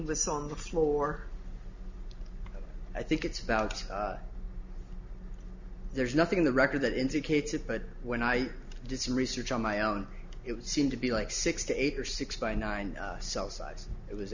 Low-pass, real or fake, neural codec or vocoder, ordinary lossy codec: 7.2 kHz; real; none; Opus, 64 kbps